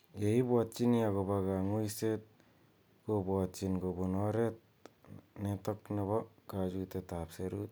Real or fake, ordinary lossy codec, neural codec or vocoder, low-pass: real; none; none; none